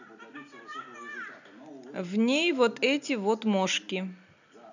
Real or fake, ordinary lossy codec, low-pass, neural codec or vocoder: real; none; 7.2 kHz; none